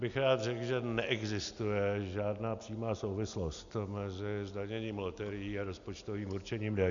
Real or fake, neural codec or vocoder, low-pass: real; none; 7.2 kHz